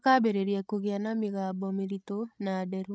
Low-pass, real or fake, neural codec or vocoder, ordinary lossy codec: none; fake; codec, 16 kHz, 16 kbps, FreqCodec, larger model; none